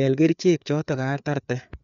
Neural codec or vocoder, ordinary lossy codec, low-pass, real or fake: codec, 16 kHz, 4 kbps, FreqCodec, larger model; none; 7.2 kHz; fake